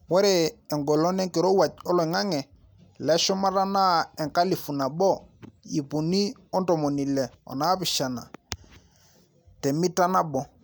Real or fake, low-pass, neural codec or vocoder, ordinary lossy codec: real; none; none; none